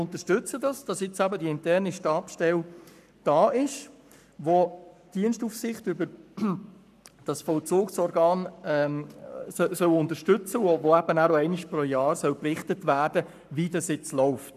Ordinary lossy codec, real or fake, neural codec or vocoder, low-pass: none; fake; codec, 44.1 kHz, 7.8 kbps, Pupu-Codec; 14.4 kHz